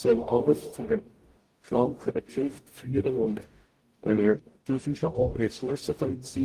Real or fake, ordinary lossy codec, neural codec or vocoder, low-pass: fake; Opus, 24 kbps; codec, 44.1 kHz, 0.9 kbps, DAC; 14.4 kHz